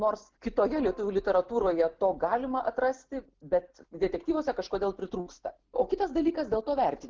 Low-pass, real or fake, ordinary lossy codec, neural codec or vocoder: 7.2 kHz; real; Opus, 16 kbps; none